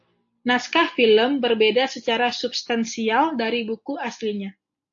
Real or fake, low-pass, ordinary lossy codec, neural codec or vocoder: real; 7.2 kHz; AAC, 64 kbps; none